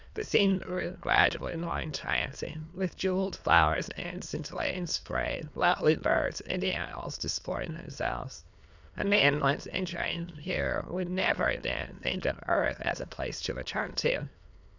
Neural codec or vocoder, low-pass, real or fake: autoencoder, 22.05 kHz, a latent of 192 numbers a frame, VITS, trained on many speakers; 7.2 kHz; fake